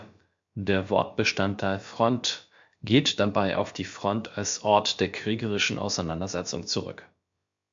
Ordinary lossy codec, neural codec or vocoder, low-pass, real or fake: MP3, 48 kbps; codec, 16 kHz, about 1 kbps, DyCAST, with the encoder's durations; 7.2 kHz; fake